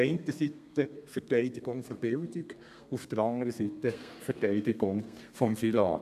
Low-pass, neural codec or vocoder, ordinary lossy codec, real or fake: 14.4 kHz; codec, 32 kHz, 1.9 kbps, SNAC; MP3, 96 kbps; fake